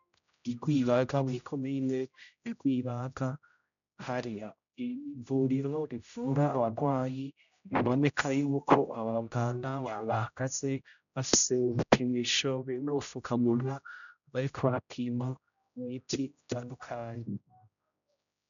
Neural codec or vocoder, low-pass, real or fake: codec, 16 kHz, 0.5 kbps, X-Codec, HuBERT features, trained on general audio; 7.2 kHz; fake